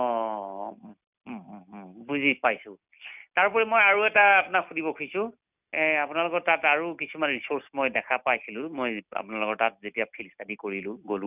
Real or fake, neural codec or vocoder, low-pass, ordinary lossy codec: real; none; 3.6 kHz; none